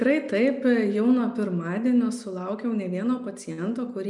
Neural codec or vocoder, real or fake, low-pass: none; real; 10.8 kHz